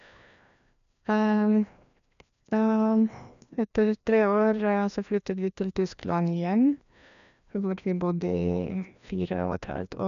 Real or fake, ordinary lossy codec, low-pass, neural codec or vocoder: fake; none; 7.2 kHz; codec, 16 kHz, 1 kbps, FreqCodec, larger model